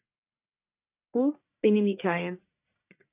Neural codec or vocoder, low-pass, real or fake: codec, 44.1 kHz, 1.7 kbps, Pupu-Codec; 3.6 kHz; fake